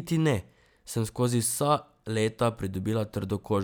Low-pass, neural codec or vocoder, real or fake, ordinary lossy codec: none; none; real; none